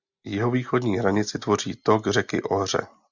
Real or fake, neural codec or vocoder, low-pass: real; none; 7.2 kHz